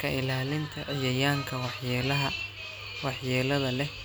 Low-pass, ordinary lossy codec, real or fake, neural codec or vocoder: none; none; real; none